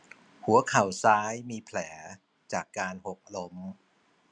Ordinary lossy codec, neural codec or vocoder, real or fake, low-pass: none; none; real; 9.9 kHz